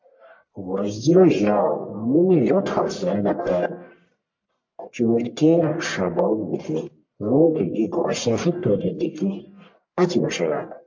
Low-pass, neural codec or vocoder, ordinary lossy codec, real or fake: 7.2 kHz; codec, 44.1 kHz, 1.7 kbps, Pupu-Codec; MP3, 48 kbps; fake